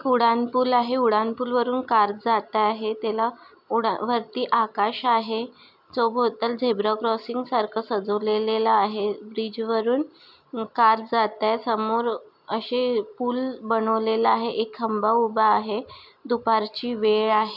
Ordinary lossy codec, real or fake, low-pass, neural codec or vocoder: none; real; 5.4 kHz; none